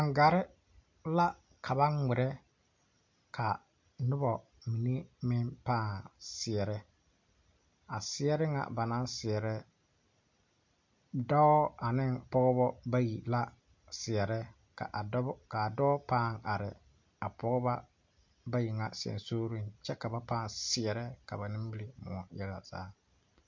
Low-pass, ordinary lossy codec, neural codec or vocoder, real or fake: 7.2 kHz; MP3, 48 kbps; none; real